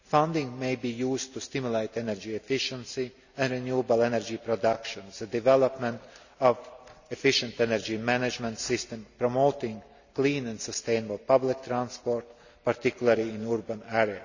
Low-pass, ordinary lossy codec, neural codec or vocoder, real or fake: 7.2 kHz; none; none; real